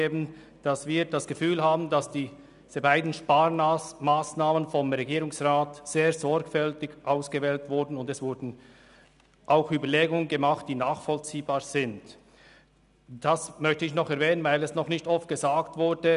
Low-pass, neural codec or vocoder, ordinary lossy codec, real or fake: 10.8 kHz; none; none; real